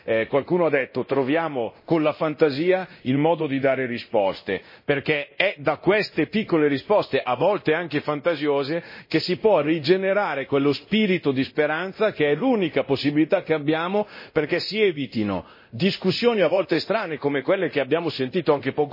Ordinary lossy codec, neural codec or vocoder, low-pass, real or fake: MP3, 24 kbps; codec, 24 kHz, 0.9 kbps, DualCodec; 5.4 kHz; fake